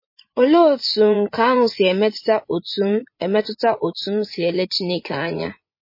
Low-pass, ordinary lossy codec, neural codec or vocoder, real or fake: 5.4 kHz; MP3, 24 kbps; vocoder, 24 kHz, 100 mel bands, Vocos; fake